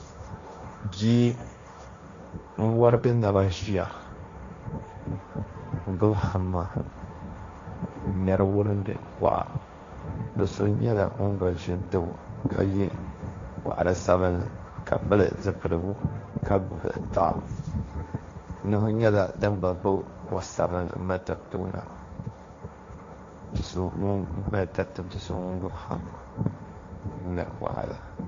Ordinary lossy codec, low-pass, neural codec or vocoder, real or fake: AAC, 48 kbps; 7.2 kHz; codec, 16 kHz, 1.1 kbps, Voila-Tokenizer; fake